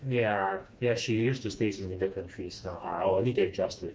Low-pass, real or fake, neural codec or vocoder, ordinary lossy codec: none; fake; codec, 16 kHz, 2 kbps, FreqCodec, smaller model; none